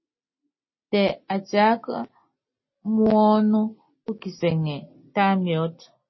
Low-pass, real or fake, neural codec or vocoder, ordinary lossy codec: 7.2 kHz; real; none; MP3, 24 kbps